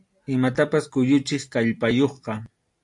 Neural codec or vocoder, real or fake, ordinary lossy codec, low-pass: none; real; AAC, 64 kbps; 10.8 kHz